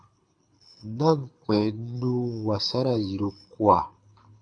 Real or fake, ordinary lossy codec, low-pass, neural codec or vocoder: fake; AAC, 64 kbps; 9.9 kHz; codec, 24 kHz, 6 kbps, HILCodec